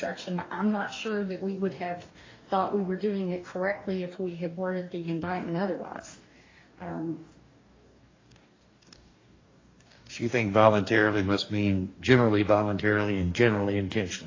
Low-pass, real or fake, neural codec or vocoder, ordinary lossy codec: 7.2 kHz; fake; codec, 44.1 kHz, 2.6 kbps, DAC; AAC, 32 kbps